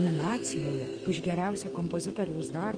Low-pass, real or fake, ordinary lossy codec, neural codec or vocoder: 9.9 kHz; fake; Opus, 32 kbps; codec, 32 kHz, 1.9 kbps, SNAC